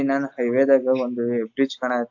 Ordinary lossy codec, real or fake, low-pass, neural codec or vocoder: AAC, 48 kbps; real; 7.2 kHz; none